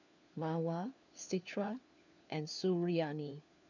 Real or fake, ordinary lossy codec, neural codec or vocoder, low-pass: fake; none; codec, 16 kHz, 4 kbps, FunCodec, trained on LibriTTS, 50 frames a second; 7.2 kHz